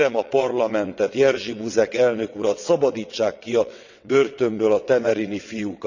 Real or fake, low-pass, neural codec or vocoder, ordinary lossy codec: fake; 7.2 kHz; vocoder, 22.05 kHz, 80 mel bands, WaveNeXt; none